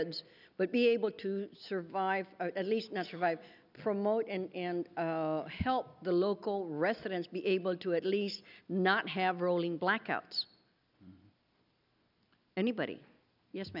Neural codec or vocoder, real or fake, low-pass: none; real; 5.4 kHz